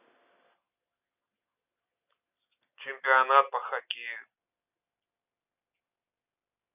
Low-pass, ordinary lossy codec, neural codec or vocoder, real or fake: 3.6 kHz; none; none; real